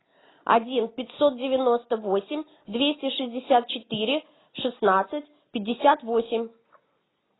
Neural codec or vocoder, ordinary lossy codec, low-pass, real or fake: none; AAC, 16 kbps; 7.2 kHz; real